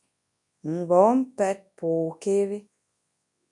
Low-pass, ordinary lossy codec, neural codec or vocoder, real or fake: 10.8 kHz; MP3, 64 kbps; codec, 24 kHz, 0.9 kbps, WavTokenizer, large speech release; fake